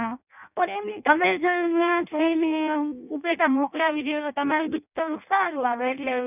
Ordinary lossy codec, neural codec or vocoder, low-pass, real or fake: none; codec, 16 kHz in and 24 kHz out, 0.6 kbps, FireRedTTS-2 codec; 3.6 kHz; fake